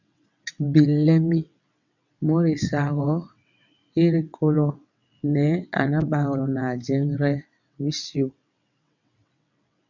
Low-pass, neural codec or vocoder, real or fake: 7.2 kHz; vocoder, 22.05 kHz, 80 mel bands, WaveNeXt; fake